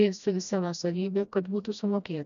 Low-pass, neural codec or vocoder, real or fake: 7.2 kHz; codec, 16 kHz, 1 kbps, FreqCodec, smaller model; fake